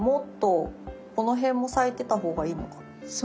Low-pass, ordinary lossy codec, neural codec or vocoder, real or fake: none; none; none; real